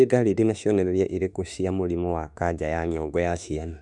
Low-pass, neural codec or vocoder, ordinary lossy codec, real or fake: 10.8 kHz; autoencoder, 48 kHz, 32 numbers a frame, DAC-VAE, trained on Japanese speech; none; fake